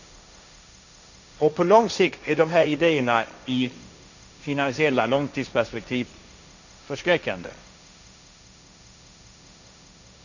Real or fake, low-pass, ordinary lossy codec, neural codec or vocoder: fake; 7.2 kHz; none; codec, 16 kHz, 1.1 kbps, Voila-Tokenizer